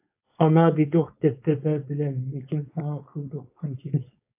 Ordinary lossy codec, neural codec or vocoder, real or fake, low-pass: AAC, 32 kbps; codec, 16 kHz, 4.8 kbps, FACodec; fake; 3.6 kHz